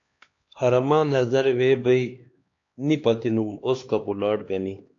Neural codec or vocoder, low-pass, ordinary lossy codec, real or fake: codec, 16 kHz, 2 kbps, X-Codec, HuBERT features, trained on LibriSpeech; 7.2 kHz; AAC, 48 kbps; fake